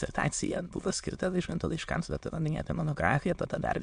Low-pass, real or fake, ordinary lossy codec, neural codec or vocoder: 9.9 kHz; fake; MP3, 64 kbps; autoencoder, 22.05 kHz, a latent of 192 numbers a frame, VITS, trained on many speakers